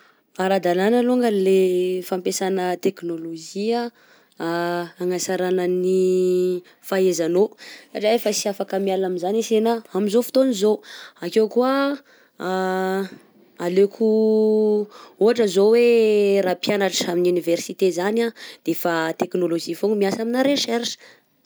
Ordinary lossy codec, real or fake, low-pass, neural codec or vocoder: none; real; none; none